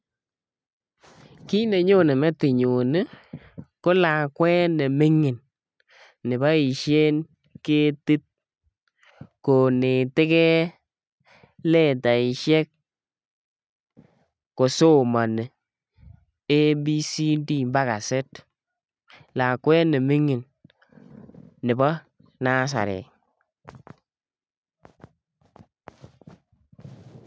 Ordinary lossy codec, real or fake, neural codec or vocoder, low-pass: none; real; none; none